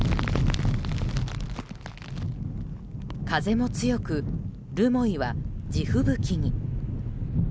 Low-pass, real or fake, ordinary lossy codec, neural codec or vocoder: none; real; none; none